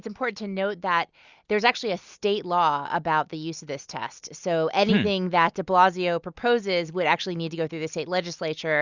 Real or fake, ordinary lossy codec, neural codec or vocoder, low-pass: real; Opus, 64 kbps; none; 7.2 kHz